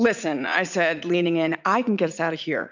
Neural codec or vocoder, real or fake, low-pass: vocoder, 44.1 kHz, 80 mel bands, Vocos; fake; 7.2 kHz